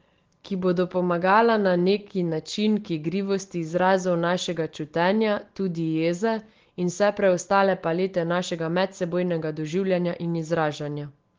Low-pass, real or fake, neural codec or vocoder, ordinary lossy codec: 7.2 kHz; real; none; Opus, 16 kbps